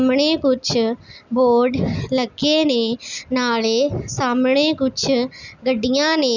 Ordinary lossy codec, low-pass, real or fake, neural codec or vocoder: none; 7.2 kHz; real; none